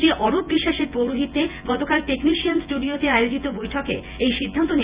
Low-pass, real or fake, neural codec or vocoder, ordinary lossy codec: 3.6 kHz; fake; vocoder, 24 kHz, 100 mel bands, Vocos; Opus, 64 kbps